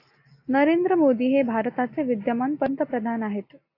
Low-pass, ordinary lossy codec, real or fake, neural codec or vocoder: 5.4 kHz; AAC, 32 kbps; real; none